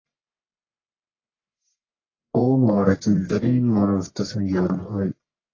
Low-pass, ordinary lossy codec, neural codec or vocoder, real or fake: 7.2 kHz; AAC, 32 kbps; codec, 44.1 kHz, 1.7 kbps, Pupu-Codec; fake